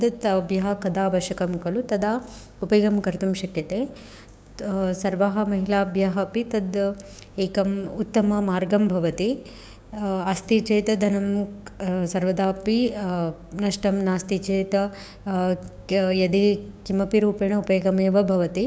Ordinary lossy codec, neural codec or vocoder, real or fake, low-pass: none; codec, 16 kHz, 6 kbps, DAC; fake; none